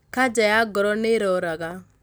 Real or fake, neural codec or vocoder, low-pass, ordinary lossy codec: real; none; none; none